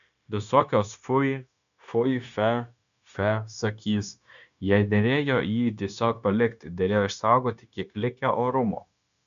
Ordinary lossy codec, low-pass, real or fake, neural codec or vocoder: MP3, 96 kbps; 7.2 kHz; fake; codec, 16 kHz, 0.9 kbps, LongCat-Audio-Codec